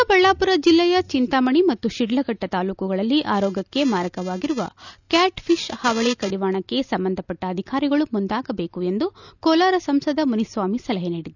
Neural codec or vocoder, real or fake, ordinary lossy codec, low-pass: none; real; none; 7.2 kHz